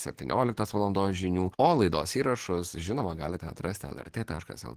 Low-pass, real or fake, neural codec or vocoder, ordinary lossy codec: 14.4 kHz; fake; codec, 44.1 kHz, 7.8 kbps, DAC; Opus, 16 kbps